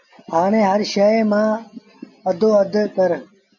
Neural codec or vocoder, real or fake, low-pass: none; real; 7.2 kHz